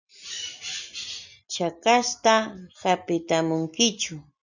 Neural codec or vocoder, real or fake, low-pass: none; real; 7.2 kHz